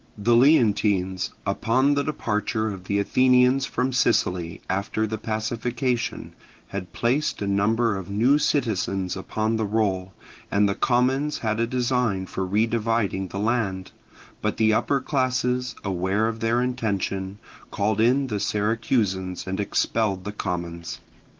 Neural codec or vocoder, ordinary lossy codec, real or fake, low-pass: none; Opus, 16 kbps; real; 7.2 kHz